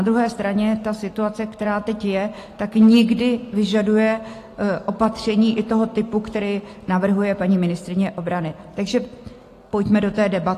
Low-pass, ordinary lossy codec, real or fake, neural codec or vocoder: 14.4 kHz; AAC, 48 kbps; real; none